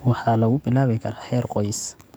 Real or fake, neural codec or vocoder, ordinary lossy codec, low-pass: fake; codec, 44.1 kHz, 7.8 kbps, DAC; none; none